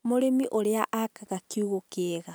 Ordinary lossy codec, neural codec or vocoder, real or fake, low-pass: none; none; real; none